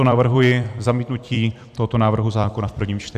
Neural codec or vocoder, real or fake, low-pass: vocoder, 44.1 kHz, 128 mel bands every 256 samples, BigVGAN v2; fake; 14.4 kHz